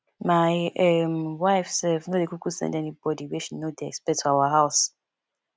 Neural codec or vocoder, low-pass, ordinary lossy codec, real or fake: none; none; none; real